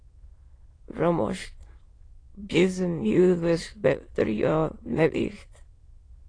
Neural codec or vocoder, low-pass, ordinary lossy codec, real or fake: autoencoder, 22.05 kHz, a latent of 192 numbers a frame, VITS, trained on many speakers; 9.9 kHz; AAC, 32 kbps; fake